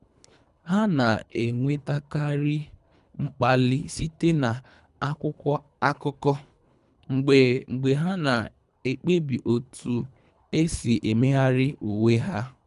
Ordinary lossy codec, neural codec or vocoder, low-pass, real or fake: none; codec, 24 kHz, 3 kbps, HILCodec; 10.8 kHz; fake